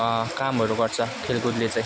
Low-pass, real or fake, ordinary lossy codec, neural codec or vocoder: none; real; none; none